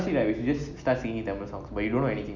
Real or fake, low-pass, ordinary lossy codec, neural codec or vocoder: real; 7.2 kHz; none; none